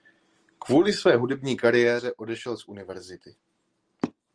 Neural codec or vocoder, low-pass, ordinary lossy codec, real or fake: none; 9.9 kHz; Opus, 24 kbps; real